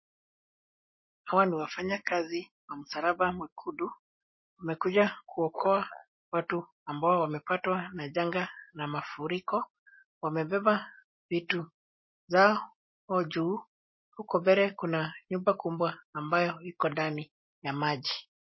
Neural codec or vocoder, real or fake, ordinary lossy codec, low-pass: none; real; MP3, 24 kbps; 7.2 kHz